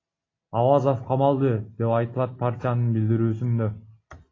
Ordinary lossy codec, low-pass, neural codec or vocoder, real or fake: AAC, 32 kbps; 7.2 kHz; none; real